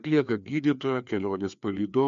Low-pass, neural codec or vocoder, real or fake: 7.2 kHz; codec, 16 kHz, 2 kbps, FreqCodec, larger model; fake